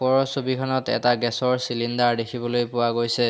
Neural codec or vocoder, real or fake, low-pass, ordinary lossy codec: none; real; none; none